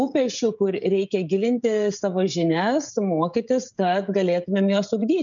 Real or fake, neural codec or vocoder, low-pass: fake; codec, 16 kHz, 16 kbps, FreqCodec, smaller model; 7.2 kHz